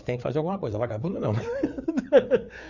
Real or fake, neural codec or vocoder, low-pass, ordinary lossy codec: fake; codec, 16 kHz, 4 kbps, FreqCodec, larger model; 7.2 kHz; none